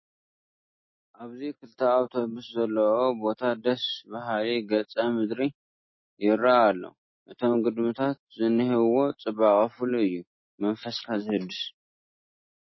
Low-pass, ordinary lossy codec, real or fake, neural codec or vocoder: 7.2 kHz; MP3, 24 kbps; real; none